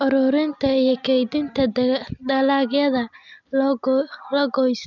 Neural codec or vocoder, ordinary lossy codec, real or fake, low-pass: none; none; real; 7.2 kHz